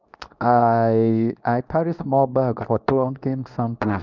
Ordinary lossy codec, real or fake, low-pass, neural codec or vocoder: none; fake; 7.2 kHz; codec, 24 kHz, 0.9 kbps, WavTokenizer, medium speech release version 2